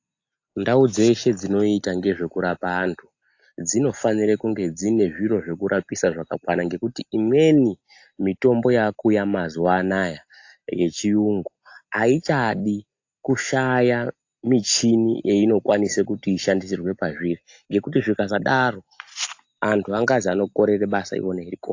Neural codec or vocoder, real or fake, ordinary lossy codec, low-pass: none; real; AAC, 48 kbps; 7.2 kHz